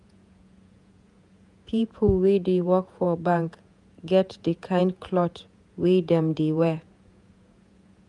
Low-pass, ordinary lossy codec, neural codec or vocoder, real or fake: 10.8 kHz; none; vocoder, 48 kHz, 128 mel bands, Vocos; fake